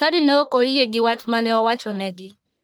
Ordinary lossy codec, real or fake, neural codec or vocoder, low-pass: none; fake; codec, 44.1 kHz, 1.7 kbps, Pupu-Codec; none